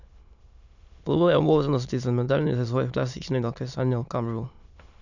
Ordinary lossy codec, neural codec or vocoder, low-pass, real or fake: none; autoencoder, 22.05 kHz, a latent of 192 numbers a frame, VITS, trained on many speakers; 7.2 kHz; fake